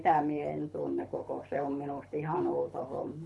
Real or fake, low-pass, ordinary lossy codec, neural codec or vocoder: fake; none; none; codec, 24 kHz, 6 kbps, HILCodec